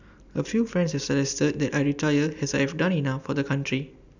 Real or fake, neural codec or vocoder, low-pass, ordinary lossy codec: real; none; 7.2 kHz; none